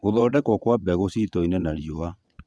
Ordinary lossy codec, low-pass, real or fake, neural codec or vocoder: none; none; fake; vocoder, 22.05 kHz, 80 mel bands, WaveNeXt